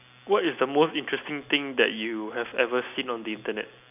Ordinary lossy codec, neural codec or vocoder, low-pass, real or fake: none; none; 3.6 kHz; real